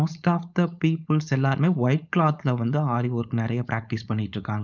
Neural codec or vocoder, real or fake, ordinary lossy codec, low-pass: codec, 16 kHz, 4.8 kbps, FACodec; fake; none; 7.2 kHz